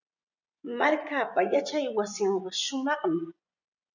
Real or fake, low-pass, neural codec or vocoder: fake; 7.2 kHz; vocoder, 24 kHz, 100 mel bands, Vocos